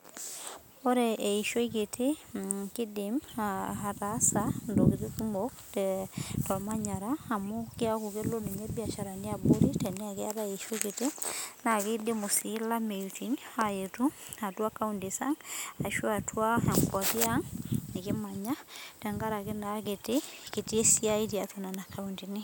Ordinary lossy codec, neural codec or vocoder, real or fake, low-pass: none; none; real; none